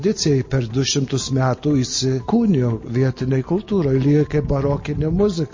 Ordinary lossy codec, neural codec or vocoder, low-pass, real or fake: MP3, 32 kbps; none; 7.2 kHz; real